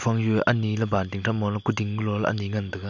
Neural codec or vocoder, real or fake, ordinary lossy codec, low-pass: none; real; none; 7.2 kHz